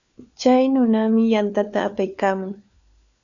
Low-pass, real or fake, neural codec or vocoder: 7.2 kHz; fake; codec, 16 kHz, 4 kbps, FunCodec, trained on LibriTTS, 50 frames a second